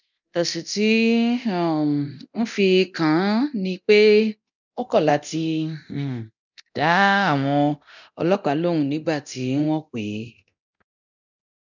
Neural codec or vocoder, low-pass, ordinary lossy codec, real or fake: codec, 24 kHz, 0.9 kbps, DualCodec; 7.2 kHz; none; fake